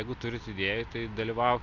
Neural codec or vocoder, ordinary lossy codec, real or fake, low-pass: none; MP3, 64 kbps; real; 7.2 kHz